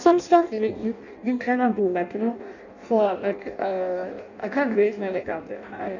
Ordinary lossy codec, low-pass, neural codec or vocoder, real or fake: none; 7.2 kHz; codec, 16 kHz in and 24 kHz out, 0.6 kbps, FireRedTTS-2 codec; fake